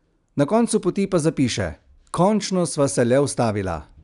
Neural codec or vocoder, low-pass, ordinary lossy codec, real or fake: none; 10.8 kHz; none; real